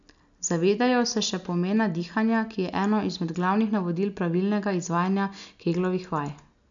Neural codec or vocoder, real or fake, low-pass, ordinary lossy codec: none; real; 7.2 kHz; none